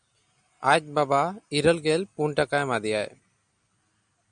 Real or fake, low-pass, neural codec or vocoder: real; 9.9 kHz; none